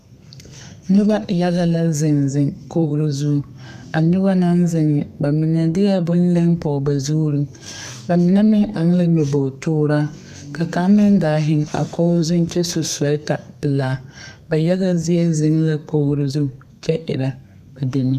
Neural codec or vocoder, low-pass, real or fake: codec, 32 kHz, 1.9 kbps, SNAC; 14.4 kHz; fake